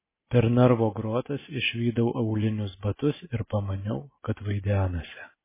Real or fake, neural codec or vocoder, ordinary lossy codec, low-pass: real; none; MP3, 16 kbps; 3.6 kHz